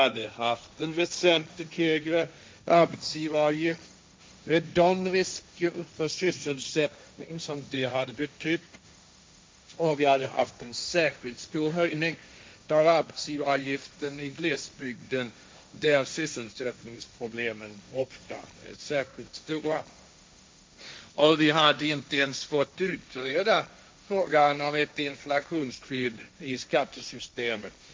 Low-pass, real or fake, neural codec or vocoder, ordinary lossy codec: none; fake; codec, 16 kHz, 1.1 kbps, Voila-Tokenizer; none